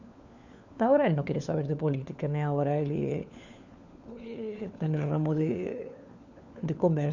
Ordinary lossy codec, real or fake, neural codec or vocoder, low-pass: none; fake; codec, 16 kHz, 4 kbps, FunCodec, trained on LibriTTS, 50 frames a second; 7.2 kHz